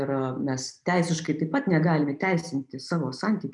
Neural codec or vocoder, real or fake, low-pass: none; real; 10.8 kHz